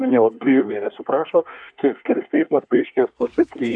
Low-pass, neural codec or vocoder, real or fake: 9.9 kHz; codec, 24 kHz, 1 kbps, SNAC; fake